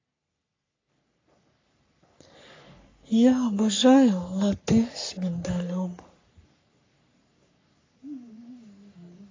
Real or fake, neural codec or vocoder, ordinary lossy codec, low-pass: fake; codec, 44.1 kHz, 3.4 kbps, Pupu-Codec; AAC, 32 kbps; 7.2 kHz